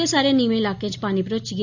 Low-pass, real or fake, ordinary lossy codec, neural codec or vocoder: 7.2 kHz; real; none; none